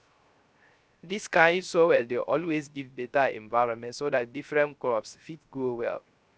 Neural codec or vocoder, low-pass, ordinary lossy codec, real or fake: codec, 16 kHz, 0.3 kbps, FocalCodec; none; none; fake